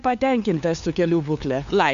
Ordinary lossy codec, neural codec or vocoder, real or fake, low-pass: MP3, 64 kbps; codec, 16 kHz, 2 kbps, FunCodec, trained on LibriTTS, 25 frames a second; fake; 7.2 kHz